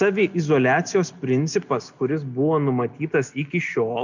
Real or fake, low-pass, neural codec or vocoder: real; 7.2 kHz; none